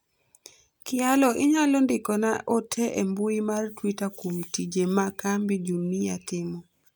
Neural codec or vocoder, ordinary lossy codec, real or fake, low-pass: none; none; real; none